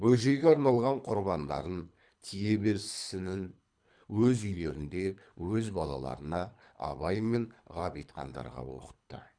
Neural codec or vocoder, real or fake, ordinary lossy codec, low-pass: codec, 24 kHz, 3 kbps, HILCodec; fake; none; 9.9 kHz